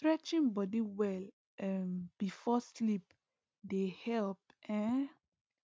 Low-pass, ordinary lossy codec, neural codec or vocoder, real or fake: none; none; none; real